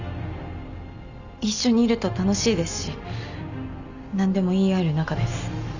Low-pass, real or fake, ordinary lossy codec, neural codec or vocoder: 7.2 kHz; real; none; none